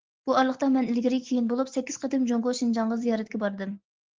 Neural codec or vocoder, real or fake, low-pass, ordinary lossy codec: none; real; 7.2 kHz; Opus, 16 kbps